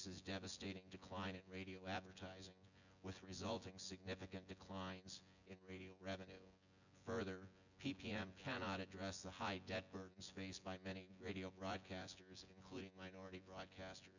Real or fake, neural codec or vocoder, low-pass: fake; vocoder, 24 kHz, 100 mel bands, Vocos; 7.2 kHz